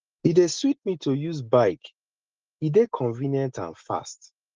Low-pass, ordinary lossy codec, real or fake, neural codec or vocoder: 7.2 kHz; Opus, 16 kbps; real; none